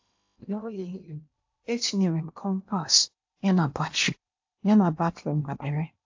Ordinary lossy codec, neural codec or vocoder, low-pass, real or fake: AAC, 48 kbps; codec, 16 kHz in and 24 kHz out, 0.8 kbps, FocalCodec, streaming, 65536 codes; 7.2 kHz; fake